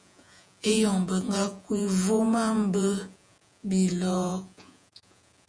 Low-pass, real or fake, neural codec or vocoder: 9.9 kHz; fake; vocoder, 48 kHz, 128 mel bands, Vocos